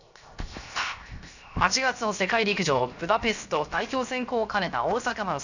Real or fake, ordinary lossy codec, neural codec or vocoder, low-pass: fake; none; codec, 16 kHz, 0.7 kbps, FocalCodec; 7.2 kHz